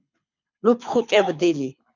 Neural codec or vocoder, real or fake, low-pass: codec, 24 kHz, 6 kbps, HILCodec; fake; 7.2 kHz